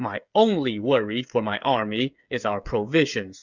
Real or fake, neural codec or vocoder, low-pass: fake; codec, 16 kHz, 8 kbps, FreqCodec, smaller model; 7.2 kHz